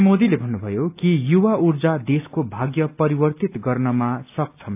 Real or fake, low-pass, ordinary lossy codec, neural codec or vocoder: real; 3.6 kHz; AAC, 32 kbps; none